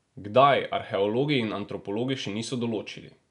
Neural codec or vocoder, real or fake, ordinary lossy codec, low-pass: none; real; none; 10.8 kHz